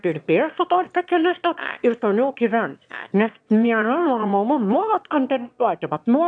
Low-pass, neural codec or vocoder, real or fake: 9.9 kHz; autoencoder, 22.05 kHz, a latent of 192 numbers a frame, VITS, trained on one speaker; fake